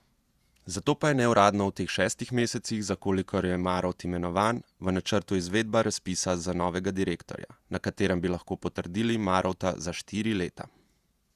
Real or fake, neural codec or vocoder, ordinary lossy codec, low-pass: fake; vocoder, 48 kHz, 128 mel bands, Vocos; Opus, 64 kbps; 14.4 kHz